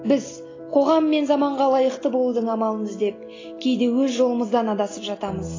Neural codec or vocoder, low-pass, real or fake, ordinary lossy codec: none; 7.2 kHz; real; AAC, 32 kbps